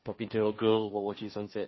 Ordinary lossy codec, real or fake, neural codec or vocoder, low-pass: MP3, 24 kbps; fake; codec, 16 kHz, 1.1 kbps, Voila-Tokenizer; 7.2 kHz